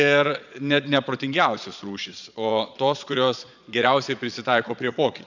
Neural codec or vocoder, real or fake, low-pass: vocoder, 22.05 kHz, 80 mel bands, Vocos; fake; 7.2 kHz